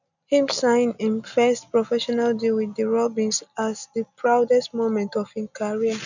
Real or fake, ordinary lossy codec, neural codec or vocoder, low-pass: real; none; none; 7.2 kHz